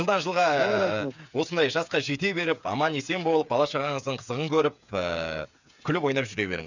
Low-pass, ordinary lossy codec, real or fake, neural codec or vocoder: 7.2 kHz; none; fake; codec, 16 kHz, 8 kbps, FreqCodec, smaller model